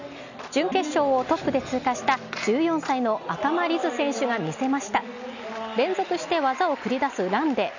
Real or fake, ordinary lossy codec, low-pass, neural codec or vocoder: real; none; 7.2 kHz; none